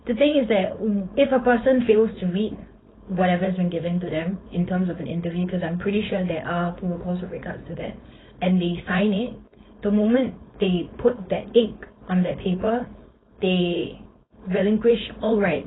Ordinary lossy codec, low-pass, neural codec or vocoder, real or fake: AAC, 16 kbps; 7.2 kHz; codec, 16 kHz, 4.8 kbps, FACodec; fake